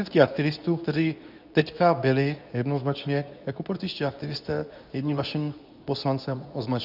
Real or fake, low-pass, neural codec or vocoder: fake; 5.4 kHz; codec, 24 kHz, 0.9 kbps, WavTokenizer, medium speech release version 2